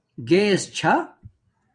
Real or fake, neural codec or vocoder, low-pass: fake; vocoder, 22.05 kHz, 80 mel bands, WaveNeXt; 9.9 kHz